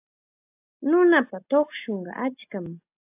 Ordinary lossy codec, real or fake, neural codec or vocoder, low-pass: AAC, 32 kbps; real; none; 3.6 kHz